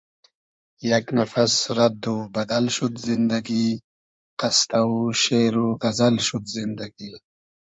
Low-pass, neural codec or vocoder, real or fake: 9.9 kHz; codec, 16 kHz in and 24 kHz out, 2.2 kbps, FireRedTTS-2 codec; fake